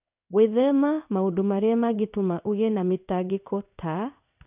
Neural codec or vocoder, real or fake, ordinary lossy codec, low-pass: codec, 16 kHz in and 24 kHz out, 1 kbps, XY-Tokenizer; fake; none; 3.6 kHz